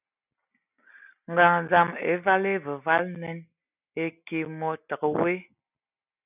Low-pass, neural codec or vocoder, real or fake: 3.6 kHz; none; real